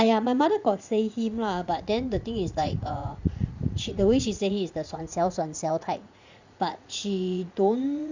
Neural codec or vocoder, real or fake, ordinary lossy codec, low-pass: vocoder, 44.1 kHz, 80 mel bands, Vocos; fake; Opus, 64 kbps; 7.2 kHz